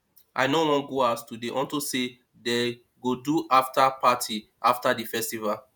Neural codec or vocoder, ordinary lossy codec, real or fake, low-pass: vocoder, 48 kHz, 128 mel bands, Vocos; none; fake; none